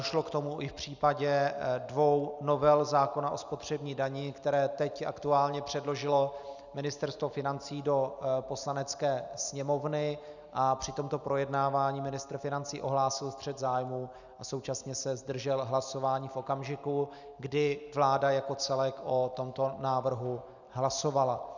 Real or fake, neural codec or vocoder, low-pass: real; none; 7.2 kHz